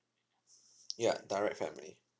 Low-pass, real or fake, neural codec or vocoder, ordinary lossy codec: none; real; none; none